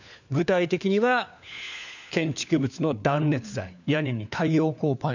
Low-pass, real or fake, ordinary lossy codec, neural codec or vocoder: 7.2 kHz; fake; none; codec, 16 kHz, 4 kbps, FunCodec, trained on LibriTTS, 50 frames a second